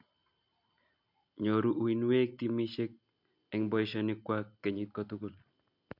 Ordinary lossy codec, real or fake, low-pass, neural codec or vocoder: MP3, 48 kbps; real; 5.4 kHz; none